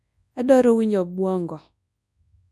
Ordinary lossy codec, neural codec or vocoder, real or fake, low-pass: none; codec, 24 kHz, 0.9 kbps, WavTokenizer, large speech release; fake; none